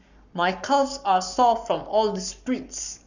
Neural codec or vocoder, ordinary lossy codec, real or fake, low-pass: codec, 44.1 kHz, 7.8 kbps, Pupu-Codec; none; fake; 7.2 kHz